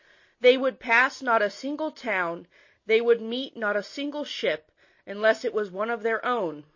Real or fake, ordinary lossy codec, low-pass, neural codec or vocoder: real; MP3, 32 kbps; 7.2 kHz; none